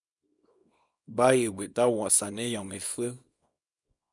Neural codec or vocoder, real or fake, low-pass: codec, 24 kHz, 0.9 kbps, WavTokenizer, small release; fake; 10.8 kHz